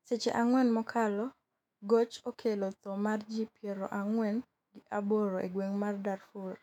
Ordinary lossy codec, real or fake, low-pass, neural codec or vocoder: none; fake; 19.8 kHz; autoencoder, 48 kHz, 128 numbers a frame, DAC-VAE, trained on Japanese speech